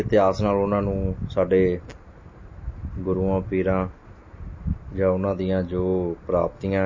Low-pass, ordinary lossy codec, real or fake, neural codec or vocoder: 7.2 kHz; MP3, 32 kbps; real; none